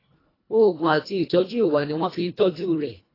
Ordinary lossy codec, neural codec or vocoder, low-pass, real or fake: AAC, 24 kbps; codec, 24 kHz, 1.5 kbps, HILCodec; 5.4 kHz; fake